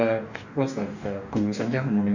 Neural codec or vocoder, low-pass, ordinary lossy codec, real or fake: codec, 44.1 kHz, 2.6 kbps, DAC; 7.2 kHz; none; fake